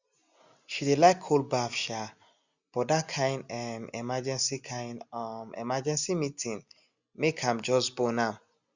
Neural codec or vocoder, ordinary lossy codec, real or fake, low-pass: none; Opus, 64 kbps; real; 7.2 kHz